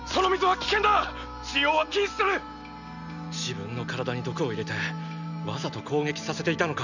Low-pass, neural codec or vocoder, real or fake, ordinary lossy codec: 7.2 kHz; none; real; none